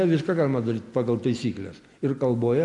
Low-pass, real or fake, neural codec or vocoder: 10.8 kHz; real; none